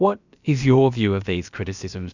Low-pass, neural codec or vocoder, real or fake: 7.2 kHz; codec, 16 kHz, about 1 kbps, DyCAST, with the encoder's durations; fake